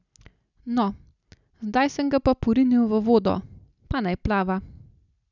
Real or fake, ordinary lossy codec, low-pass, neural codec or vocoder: real; none; 7.2 kHz; none